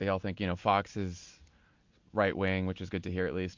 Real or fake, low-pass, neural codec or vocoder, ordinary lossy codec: real; 7.2 kHz; none; MP3, 48 kbps